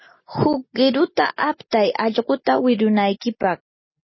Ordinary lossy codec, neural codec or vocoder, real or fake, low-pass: MP3, 24 kbps; none; real; 7.2 kHz